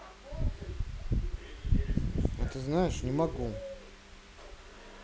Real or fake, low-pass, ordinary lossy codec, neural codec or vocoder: real; none; none; none